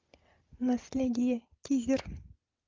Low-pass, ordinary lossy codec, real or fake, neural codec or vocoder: 7.2 kHz; Opus, 32 kbps; real; none